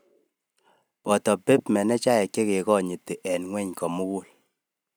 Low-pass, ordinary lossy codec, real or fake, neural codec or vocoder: none; none; real; none